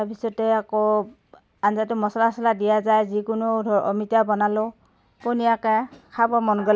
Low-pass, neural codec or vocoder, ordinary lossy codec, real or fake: none; none; none; real